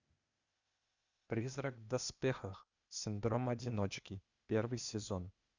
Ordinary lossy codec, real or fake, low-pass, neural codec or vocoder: Opus, 64 kbps; fake; 7.2 kHz; codec, 16 kHz, 0.8 kbps, ZipCodec